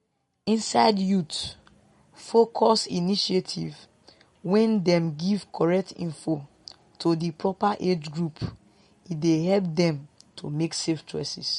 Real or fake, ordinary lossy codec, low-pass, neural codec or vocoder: real; MP3, 48 kbps; 19.8 kHz; none